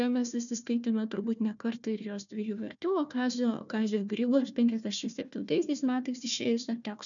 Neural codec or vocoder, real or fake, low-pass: codec, 16 kHz, 1 kbps, FunCodec, trained on Chinese and English, 50 frames a second; fake; 7.2 kHz